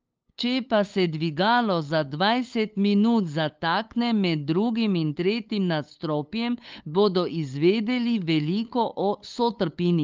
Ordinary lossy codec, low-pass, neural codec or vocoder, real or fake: Opus, 24 kbps; 7.2 kHz; codec, 16 kHz, 8 kbps, FunCodec, trained on LibriTTS, 25 frames a second; fake